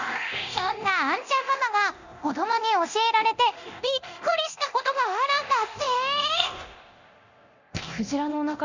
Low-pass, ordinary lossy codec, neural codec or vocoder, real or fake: 7.2 kHz; Opus, 64 kbps; codec, 24 kHz, 0.9 kbps, DualCodec; fake